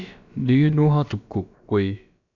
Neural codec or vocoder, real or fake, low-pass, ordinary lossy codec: codec, 16 kHz, about 1 kbps, DyCAST, with the encoder's durations; fake; 7.2 kHz; none